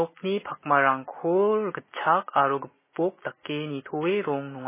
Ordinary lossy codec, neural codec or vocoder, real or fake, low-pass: MP3, 16 kbps; none; real; 3.6 kHz